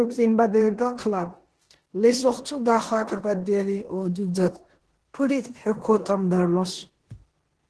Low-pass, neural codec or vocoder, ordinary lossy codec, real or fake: 10.8 kHz; codec, 16 kHz in and 24 kHz out, 0.9 kbps, LongCat-Audio-Codec, four codebook decoder; Opus, 16 kbps; fake